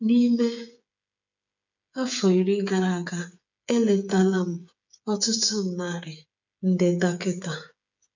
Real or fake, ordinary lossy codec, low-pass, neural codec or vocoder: fake; none; 7.2 kHz; codec, 16 kHz, 8 kbps, FreqCodec, smaller model